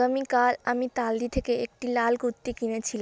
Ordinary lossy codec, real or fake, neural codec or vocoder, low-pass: none; real; none; none